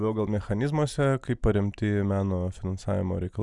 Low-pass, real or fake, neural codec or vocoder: 10.8 kHz; real; none